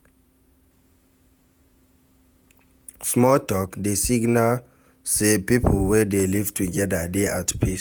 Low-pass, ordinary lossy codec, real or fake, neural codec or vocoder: none; none; fake; vocoder, 48 kHz, 128 mel bands, Vocos